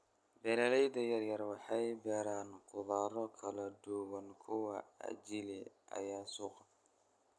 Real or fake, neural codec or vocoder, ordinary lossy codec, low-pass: real; none; none; none